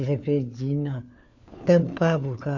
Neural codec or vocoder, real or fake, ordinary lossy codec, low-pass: codec, 16 kHz, 4 kbps, FunCodec, trained on LibriTTS, 50 frames a second; fake; none; 7.2 kHz